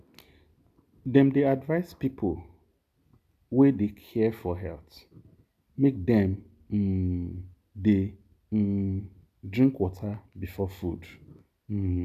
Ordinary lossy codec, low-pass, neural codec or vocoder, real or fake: none; 14.4 kHz; none; real